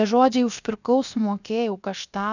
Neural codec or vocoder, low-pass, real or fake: codec, 16 kHz, 0.7 kbps, FocalCodec; 7.2 kHz; fake